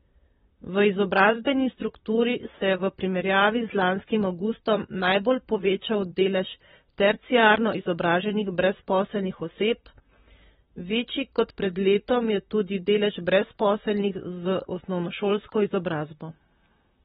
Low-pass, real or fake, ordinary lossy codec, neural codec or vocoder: 19.8 kHz; fake; AAC, 16 kbps; vocoder, 44.1 kHz, 128 mel bands, Pupu-Vocoder